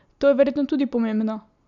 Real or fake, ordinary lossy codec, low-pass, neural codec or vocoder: real; none; 7.2 kHz; none